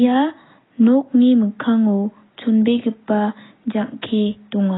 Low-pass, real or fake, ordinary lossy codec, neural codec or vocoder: 7.2 kHz; real; AAC, 16 kbps; none